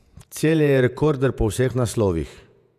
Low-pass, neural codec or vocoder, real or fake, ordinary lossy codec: 14.4 kHz; vocoder, 48 kHz, 128 mel bands, Vocos; fake; none